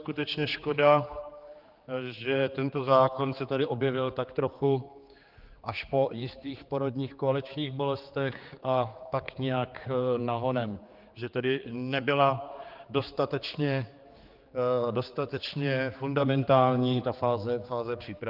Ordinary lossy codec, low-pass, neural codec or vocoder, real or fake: Opus, 32 kbps; 5.4 kHz; codec, 16 kHz, 4 kbps, X-Codec, HuBERT features, trained on general audio; fake